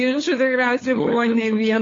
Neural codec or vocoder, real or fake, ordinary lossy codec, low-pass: codec, 16 kHz, 8 kbps, FunCodec, trained on LibriTTS, 25 frames a second; fake; AAC, 32 kbps; 7.2 kHz